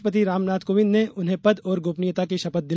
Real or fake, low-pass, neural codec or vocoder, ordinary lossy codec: real; none; none; none